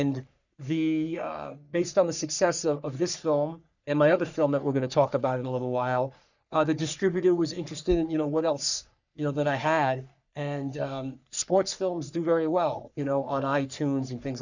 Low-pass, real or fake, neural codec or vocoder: 7.2 kHz; fake; codec, 44.1 kHz, 3.4 kbps, Pupu-Codec